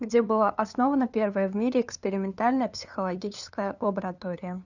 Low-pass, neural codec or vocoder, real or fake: 7.2 kHz; codec, 16 kHz, 2 kbps, FunCodec, trained on LibriTTS, 25 frames a second; fake